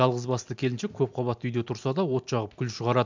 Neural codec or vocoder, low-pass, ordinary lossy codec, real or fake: none; 7.2 kHz; MP3, 64 kbps; real